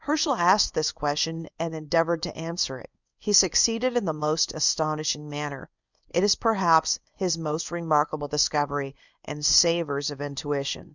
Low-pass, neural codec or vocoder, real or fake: 7.2 kHz; codec, 24 kHz, 0.9 kbps, WavTokenizer, medium speech release version 1; fake